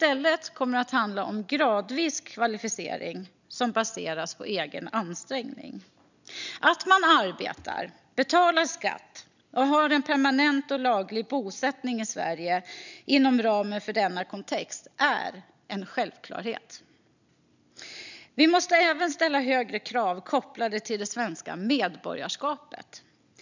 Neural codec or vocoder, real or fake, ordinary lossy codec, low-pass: none; real; none; 7.2 kHz